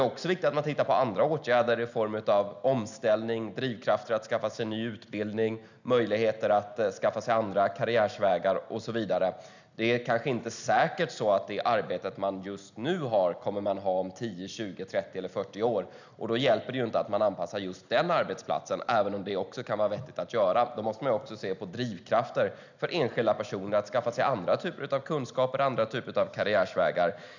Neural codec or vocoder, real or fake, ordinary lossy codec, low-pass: none; real; none; 7.2 kHz